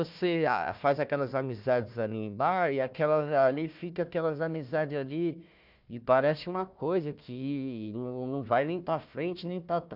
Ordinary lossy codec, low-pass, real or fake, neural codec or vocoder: none; 5.4 kHz; fake; codec, 16 kHz, 1 kbps, FunCodec, trained on Chinese and English, 50 frames a second